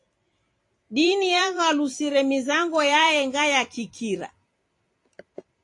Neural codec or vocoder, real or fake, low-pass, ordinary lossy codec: vocoder, 44.1 kHz, 128 mel bands every 256 samples, BigVGAN v2; fake; 10.8 kHz; AAC, 48 kbps